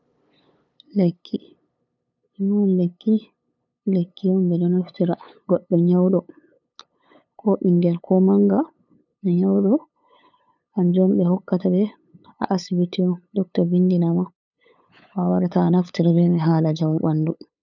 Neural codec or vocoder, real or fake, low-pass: codec, 16 kHz, 8 kbps, FunCodec, trained on LibriTTS, 25 frames a second; fake; 7.2 kHz